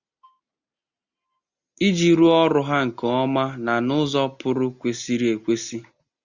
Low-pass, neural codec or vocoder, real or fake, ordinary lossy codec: 7.2 kHz; none; real; Opus, 64 kbps